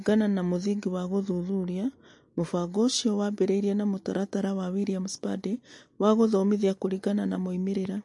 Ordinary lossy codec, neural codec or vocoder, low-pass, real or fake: MP3, 48 kbps; none; 10.8 kHz; real